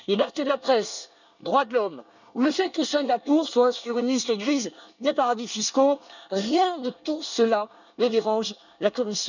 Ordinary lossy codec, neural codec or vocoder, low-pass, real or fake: none; codec, 24 kHz, 1 kbps, SNAC; 7.2 kHz; fake